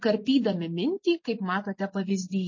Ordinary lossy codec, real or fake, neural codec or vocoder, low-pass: MP3, 32 kbps; real; none; 7.2 kHz